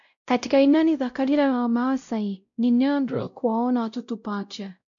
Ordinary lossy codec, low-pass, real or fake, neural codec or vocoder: MP3, 64 kbps; 7.2 kHz; fake; codec, 16 kHz, 0.5 kbps, X-Codec, WavLM features, trained on Multilingual LibriSpeech